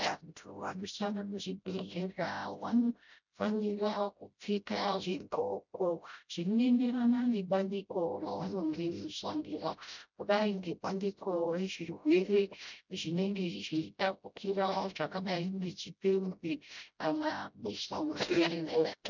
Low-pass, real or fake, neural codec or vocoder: 7.2 kHz; fake; codec, 16 kHz, 0.5 kbps, FreqCodec, smaller model